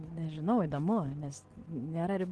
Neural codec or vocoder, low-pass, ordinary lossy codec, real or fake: none; 10.8 kHz; Opus, 16 kbps; real